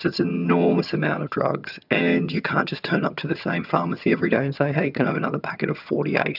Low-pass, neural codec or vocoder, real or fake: 5.4 kHz; vocoder, 22.05 kHz, 80 mel bands, HiFi-GAN; fake